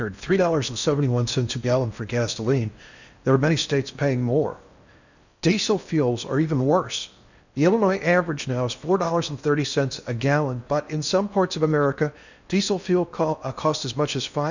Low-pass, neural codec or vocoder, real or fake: 7.2 kHz; codec, 16 kHz in and 24 kHz out, 0.6 kbps, FocalCodec, streaming, 4096 codes; fake